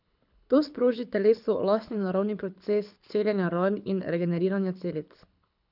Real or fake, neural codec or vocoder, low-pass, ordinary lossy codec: fake; codec, 24 kHz, 6 kbps, HILCodec; 5.4 kHz; none